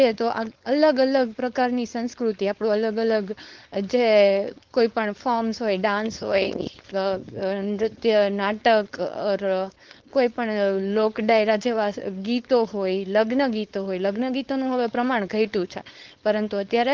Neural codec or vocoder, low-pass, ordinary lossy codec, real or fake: codec, 16 kHz, 4.8 kbps, FACodec; 7.2 kHz; Opus, 16 kbps; fake